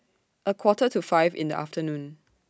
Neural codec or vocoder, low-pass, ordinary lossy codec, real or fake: none; none; none; real